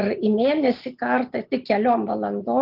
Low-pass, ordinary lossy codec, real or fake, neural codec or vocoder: 5.4 kHz; Opus, 32 kbps; real; none